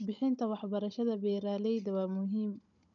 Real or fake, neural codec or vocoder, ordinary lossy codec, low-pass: fake; codec, 16 kHz, 16 kbps, FunCodec, trained on Chinese and English, 50 frames a second; none; 7.2 kHz